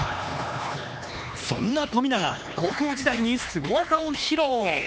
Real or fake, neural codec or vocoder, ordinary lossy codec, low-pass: fake; codec, 16 kHz, 2 kbps, X-Codec, HuBERT features, trained on LibriSpeech; none; none